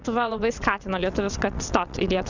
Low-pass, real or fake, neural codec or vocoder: 7.2 kHz; fake; vocoder, 22.05 kHz, 80 mel bands, WaveNeXt